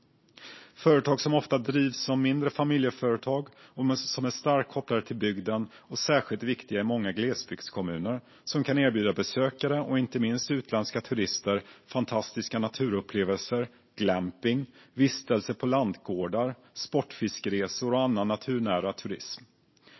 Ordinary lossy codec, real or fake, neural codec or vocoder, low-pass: MP3, 24 kbps; real; none; 7.2 kHz